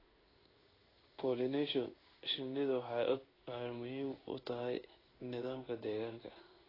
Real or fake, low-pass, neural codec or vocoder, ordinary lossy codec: fake; 5.4 kHz; codec, 16 kHz in and 24 kHz out, 1 kbps, XY-Tokenizer; AAC, 24 kbps